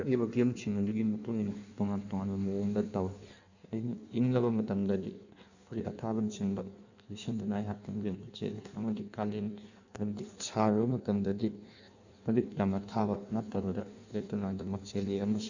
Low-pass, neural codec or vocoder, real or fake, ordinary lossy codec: 7.2 kHz; codec, 16 kHz in and 24 kHz out, 1.1 kbps, FireRedTTS-2 codec; fake; none